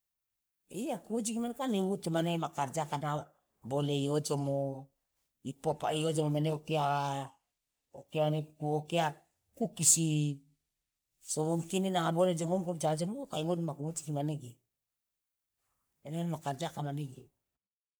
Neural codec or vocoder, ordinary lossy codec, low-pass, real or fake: codec, 44.1 kHz, 3.4 kbps, Pupu-Codec; none; none; fake